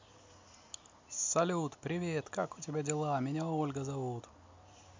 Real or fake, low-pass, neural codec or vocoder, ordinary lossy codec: real; 7.2 kHz; none; MP3, 64 kbps